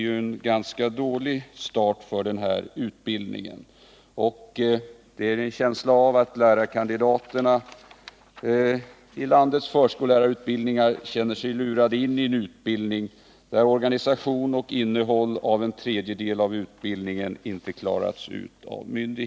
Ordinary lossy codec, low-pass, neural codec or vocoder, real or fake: none; none; none; real